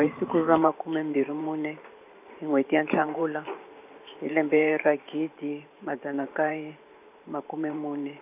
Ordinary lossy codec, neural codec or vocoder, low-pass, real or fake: none; vocoder, 44.1 kHz, 128 mel bands every 512 samples, BigVGAN v2; 3.6 kHz; fake